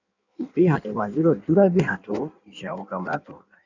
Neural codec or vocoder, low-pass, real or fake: codec, 16 kHz in and 24 kHz out, 1.1 kbps, FireRedTTS-2 codec; 7.2 kHz; fake